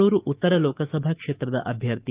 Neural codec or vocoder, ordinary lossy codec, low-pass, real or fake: autoencoder, 48 kHz, 128 numbers a frame, DAC-VAE, trained on Japanese speech; Opus, 32 kbps; 3.6 kHz; fake